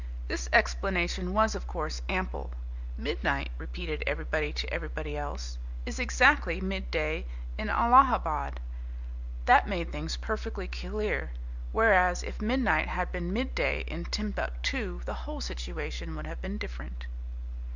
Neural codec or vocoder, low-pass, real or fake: none; 7.2 kHz; real